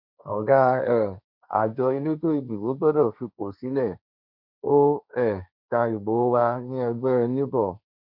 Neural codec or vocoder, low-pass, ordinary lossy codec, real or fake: codec, 16 kHz, 1.1 kbps, Voila-Tokenizer; 5.4 kHz; none; fake